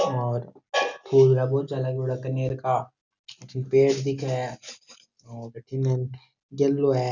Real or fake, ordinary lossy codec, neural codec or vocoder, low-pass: real; none; none; 7.2 kHz